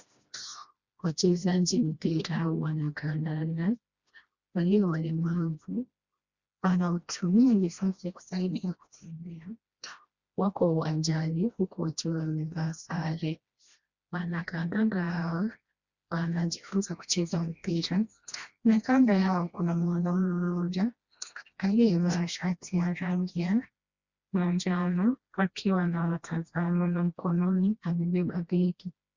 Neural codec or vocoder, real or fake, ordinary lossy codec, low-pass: codec, 16 kHz, 1 kbps, FreqCodec, smaller model; fake; Opus, 64 kbps; 7.2 kHz